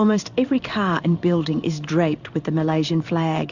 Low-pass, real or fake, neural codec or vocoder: 7.2 kHz; fake; codec, 16 kHz in and 24 kHz out, 1 kbps, XY-Tokenizer